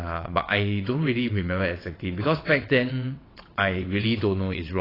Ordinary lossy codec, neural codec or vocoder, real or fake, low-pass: AAC, 24 kbps; vocoder, 22.05 kHz, 80 mel bands, WaveNeXt; fake; 5.4 kHz